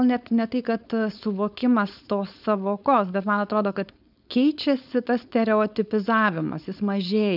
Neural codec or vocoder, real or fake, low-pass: codec, 16 kHz, 4.8 kbps, FACodec; fake; 5.4 kHz